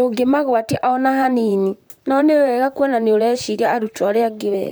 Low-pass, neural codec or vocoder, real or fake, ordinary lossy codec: none; vocoder, 44.1 kHz, 128 mel bands, Pupu-Vocoder; fake; none